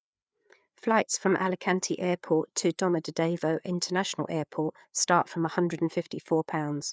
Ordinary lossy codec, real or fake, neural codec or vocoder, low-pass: none; fake; codec, 16 kHz, 4 kbps, FreqCodec, larger model; none